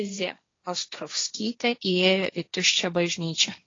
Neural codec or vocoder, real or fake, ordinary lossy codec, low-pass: codec, 16 kHz, 1.1 kbps, Voila-Tokenizer; fake; AAC, 32 kbps; 7.2 kHz